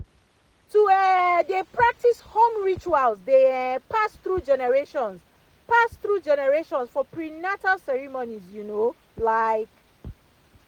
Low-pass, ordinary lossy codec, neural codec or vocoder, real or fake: 19.8 kHz; Opus, 24 kbps; none; real